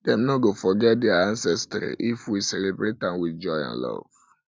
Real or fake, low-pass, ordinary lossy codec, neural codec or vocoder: real; none; none; none